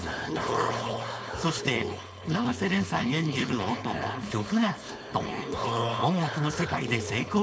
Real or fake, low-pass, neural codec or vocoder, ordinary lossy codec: fake; none; codec, 16 kHz, 4.8 kbps, FACodec; none